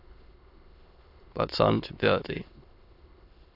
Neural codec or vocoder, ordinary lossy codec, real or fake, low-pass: autoencoder, 22.05 kHz, a latent of 192 numbers a frame, VITS, trained on many speakers; AAC, 32 kbps; fake; 5.4 kHz